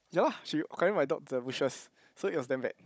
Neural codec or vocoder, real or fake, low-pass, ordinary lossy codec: codec, 16 kHz, 16 kbps, FunCodec, trained on Chinese and English, 50 frames a second; fake; none; none